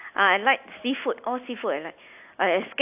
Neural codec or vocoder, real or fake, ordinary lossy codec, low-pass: none; real; AAC, 32 kbps; 3.6 kHz